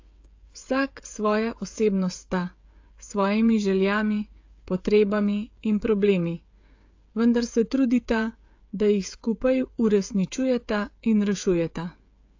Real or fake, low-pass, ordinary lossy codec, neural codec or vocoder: fake; 7.2 kHz; AAC, 48 kbps; codec, 16 kHz, 16 kbps, FreqCodec, smaller model